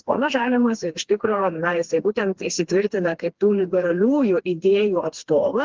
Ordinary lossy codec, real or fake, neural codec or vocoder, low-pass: Opus, 16 kbps; fake; codec, 16 kHz, 2 kbps, FreqCodec, smaller model; 7.2 kHz